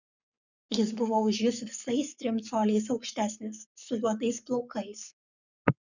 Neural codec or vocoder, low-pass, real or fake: codec, 44.1 kHz, 7.8 kbps, Pupu-Codec; 7.2 kHz; fake